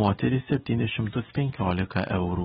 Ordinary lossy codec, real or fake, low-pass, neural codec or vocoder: AAC, 16 kbps; real; 7.2 kHz; none